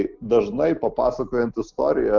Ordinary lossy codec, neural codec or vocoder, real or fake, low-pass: Opus, 24 kbps; none; real; 7.2 kHz